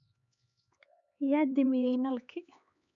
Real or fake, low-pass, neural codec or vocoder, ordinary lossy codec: fake; 7.2 kHz; codec, 16 kHz, 4 kbps, X-Codec, HuBERT features, trained on LibriSpeech; none